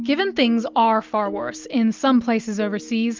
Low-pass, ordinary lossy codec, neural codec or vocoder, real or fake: 7.2 kHz; Opus, 24 kbps; none; real